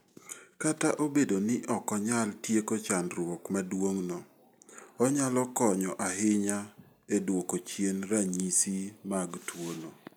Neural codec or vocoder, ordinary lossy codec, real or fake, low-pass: none; none; real; none